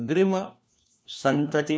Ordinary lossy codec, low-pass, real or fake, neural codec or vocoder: none; none; fake; codec, 16 kHz, 1 kbps, FunCodec, trained on LibriTTS, 50 frames a second